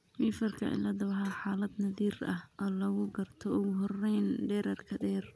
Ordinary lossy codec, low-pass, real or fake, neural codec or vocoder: none; none; real; none